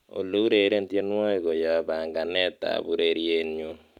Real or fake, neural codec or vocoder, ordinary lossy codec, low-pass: real; none; none; 19.8 kHz